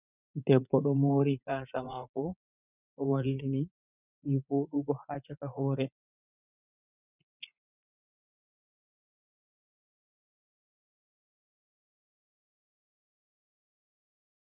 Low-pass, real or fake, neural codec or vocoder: 3.6 kHz; fake; vocoder, 44.1 kHz, 80 mel bands, Vocos